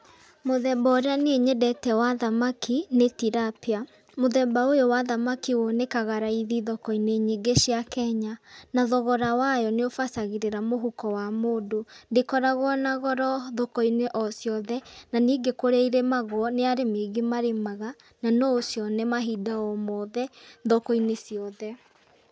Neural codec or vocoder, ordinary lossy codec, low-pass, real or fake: none; none; none; real